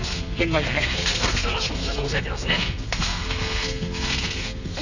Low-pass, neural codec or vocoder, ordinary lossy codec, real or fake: 7.2 kHz; codec, 32 kHz, 1.9 kbps, SNAC; none; fake